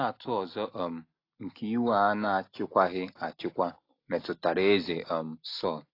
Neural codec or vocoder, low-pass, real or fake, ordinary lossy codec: none; 5.4 kHz; real; AAC, 32 kbps